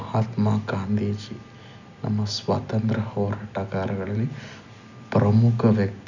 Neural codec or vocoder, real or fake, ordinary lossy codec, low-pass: none; real; none; 7.2 kHz